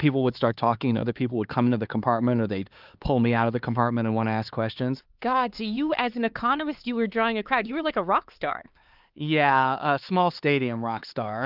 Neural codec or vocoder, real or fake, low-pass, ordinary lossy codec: codec, 16 kHz, 4 kbps, X-Codec, HuBERT features, trained on LibriSpeech; fake; 5.4 kHz; Opus, 32 kbps